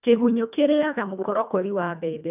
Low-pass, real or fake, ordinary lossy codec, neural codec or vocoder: 3.6 kHz; fake; none; codec, 24 kHz, 1.5 kbps, HILCodec